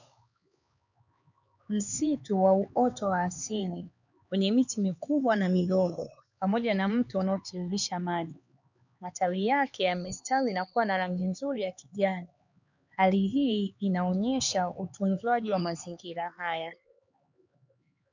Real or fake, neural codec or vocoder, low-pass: fake; codec, 16 kHz, 4 kbps, X-Codec, HuBERT features, trained on LibriSpeech; 7.2 kHz